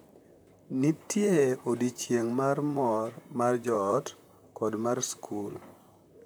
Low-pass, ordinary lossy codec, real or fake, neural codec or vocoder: none; none; fake; vocoder, 44.1 kHz, 128 mel bands, Pupu-Vocoder